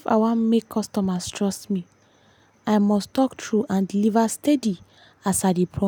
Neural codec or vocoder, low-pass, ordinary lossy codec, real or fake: none; 19.8 kHz; none; real